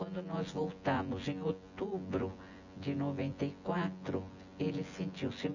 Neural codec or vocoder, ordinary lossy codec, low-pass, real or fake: vocoder, 24 kHz, 100 mel bands, Vocos; none; 7.2 kHz; fake